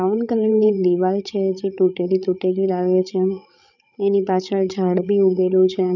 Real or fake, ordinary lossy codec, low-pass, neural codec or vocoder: fake; none; 7.2 kHz; codec, 16 kHz, 8 kbps, FreqCodec, larger model